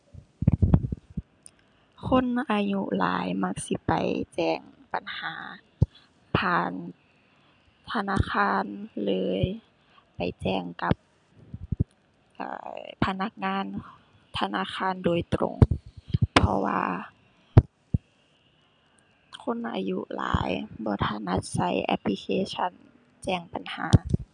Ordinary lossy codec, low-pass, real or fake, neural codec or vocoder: none; 9.9 kHz; real; none